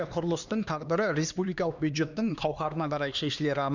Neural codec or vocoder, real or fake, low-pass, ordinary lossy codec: codec, 16 kHz, 2 kbps, X-Codec, HuBERT features, trained on LibriSpeech; fake; 7.2 kHz; none